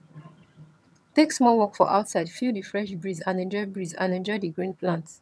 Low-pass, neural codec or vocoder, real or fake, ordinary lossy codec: none; vocoder, 22.05 kHz, 80 mel bands, HiFi-GAN; fake; none